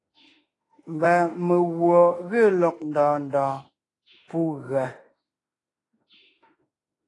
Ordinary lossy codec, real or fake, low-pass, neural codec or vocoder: AAC, 32 kbps; fake; 10.8 kHz; autoencoder, 48 kHz, 32 numbers a frame, DAC-VAE, trained on Japanese speech